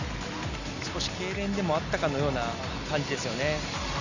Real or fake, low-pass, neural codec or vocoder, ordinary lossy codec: real; 7.2 kHz; none; none